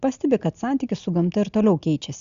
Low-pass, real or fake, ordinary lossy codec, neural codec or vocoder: 7.2 kHz; real; Opus, 64 kbps; none